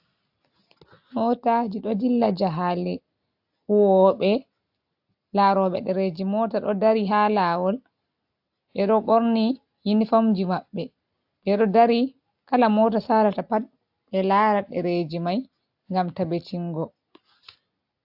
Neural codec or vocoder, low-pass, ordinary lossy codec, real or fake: none; 5.4 kHz; AAC, 48 kbps; real